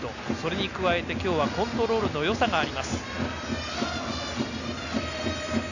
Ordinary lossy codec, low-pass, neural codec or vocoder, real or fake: none; 7.2 kHz; none; real